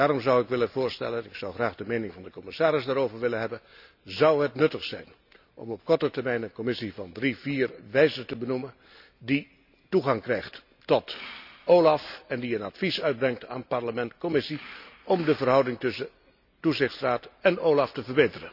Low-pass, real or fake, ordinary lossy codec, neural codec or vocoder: 5.4 kHz; real; none; none